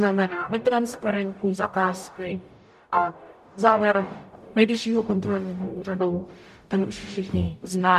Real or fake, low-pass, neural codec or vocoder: fake; 14.4 kHz; codec, 44.1 kHz, 0.9 kbps, DAC